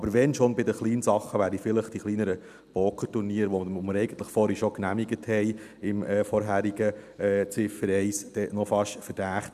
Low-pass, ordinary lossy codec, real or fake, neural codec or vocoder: 14.4 kHz; none; real; none